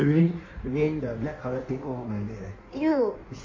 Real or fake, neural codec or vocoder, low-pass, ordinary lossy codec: fake; codec, 16 kHz in and 24 kHz out, 1.1 kbps, FireRedTTS-2 codec; 7.2 kHz; MP3, 32 kbps